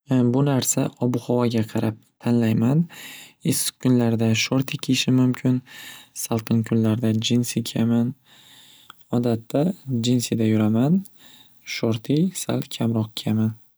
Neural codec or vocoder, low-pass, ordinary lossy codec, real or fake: none; none; none; real